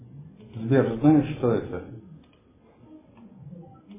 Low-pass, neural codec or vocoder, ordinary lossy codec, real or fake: 3.6 kHz; none; MP3, 16 kbps; real